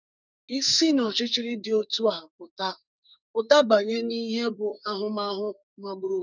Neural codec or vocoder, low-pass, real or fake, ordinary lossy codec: codec, 44.1 kHz, 2.6 kbps, SNAC; 7.2 kHz; fake; none